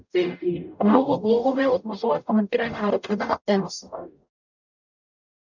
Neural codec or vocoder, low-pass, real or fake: codec, 44.1 kHz, 0.9 kbps, DAC; 7.2 kHz; fake